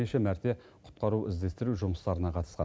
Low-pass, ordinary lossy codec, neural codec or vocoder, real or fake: none; none; none; real